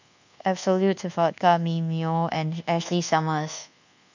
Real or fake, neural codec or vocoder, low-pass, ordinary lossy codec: fake; codec, 24 kHz, 1.2 kbps, DualCodec; 7.2 kHz; none